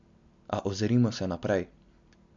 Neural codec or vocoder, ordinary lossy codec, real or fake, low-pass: none; MP3, 64 kbps; real; 7.2 kHz